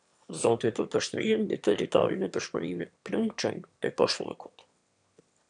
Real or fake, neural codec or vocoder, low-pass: fake; autoencoder, 22.05 kHz, a latent of 192 numbers a frame, VITS, trained on one speaker; 9.9 kHz